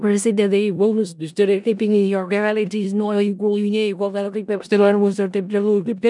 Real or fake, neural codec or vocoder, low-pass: fake; codec, 16 kHz in and 24 kHz out, 0.4 kbps, LongCat-Audio-Codec, four codebook decoder; 10.8 kHz